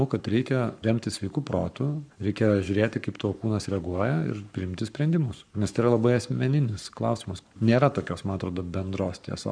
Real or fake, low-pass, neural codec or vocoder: fake; 9.9 kHz; codec, 44.1 kHz, 7.8 kbps, Pupu-Codec